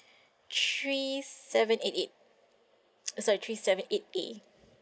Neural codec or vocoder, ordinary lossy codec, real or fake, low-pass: none; none; real; none